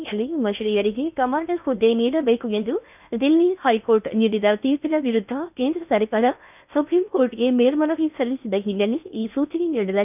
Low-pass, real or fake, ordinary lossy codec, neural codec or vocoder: 3.6 kHz; fake; none; codec, 16 kHz in and 24 kHz out, 0.6 kbps, FocalCodec, streaming, 2048 codes